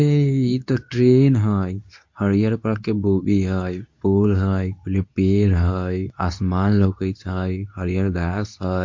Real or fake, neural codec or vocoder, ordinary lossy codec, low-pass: fake; codec, 24 kHz, 0.9 kbps, WavTokenizer, medium speech release version 2; MP3, 48 kbps; 7.2 kHz